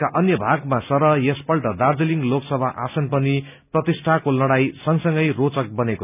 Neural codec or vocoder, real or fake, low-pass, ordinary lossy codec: none; real; 3.6 kHz; none